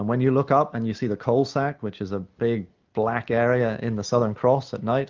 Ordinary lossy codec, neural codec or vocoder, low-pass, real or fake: Opus, 24 kbps; none; 7.2 kHz; real